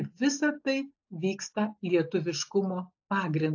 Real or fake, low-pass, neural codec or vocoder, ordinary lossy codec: real; 7.2 kHz; none; AAC, 48 kbps